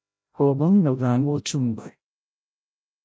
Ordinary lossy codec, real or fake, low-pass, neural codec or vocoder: none; fake; none; codec, 16 kHz, 0.5 kbps, FreqCodec, larger model